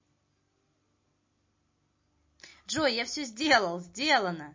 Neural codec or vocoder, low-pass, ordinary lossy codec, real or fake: none; 7.2 kHz; MP3, 32 kbps; real